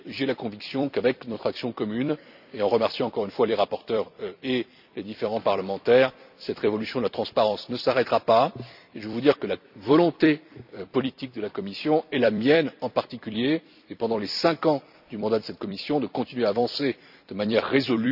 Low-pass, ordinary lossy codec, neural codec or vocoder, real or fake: 5.4 kHz; none; vocoder, 44.1 kHz, 128 mel bands every 256 samples, BigVGAN v2; fake